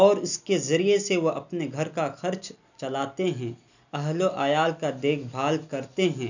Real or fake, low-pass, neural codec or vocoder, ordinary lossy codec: real; 7.2 kHz; none; none